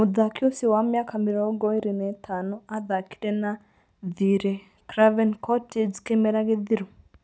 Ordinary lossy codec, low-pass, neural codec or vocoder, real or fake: none; none; none; real